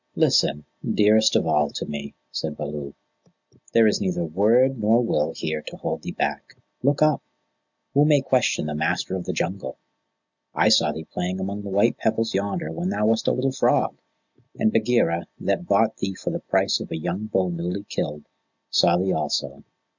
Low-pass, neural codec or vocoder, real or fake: 7.2 kHz; none; real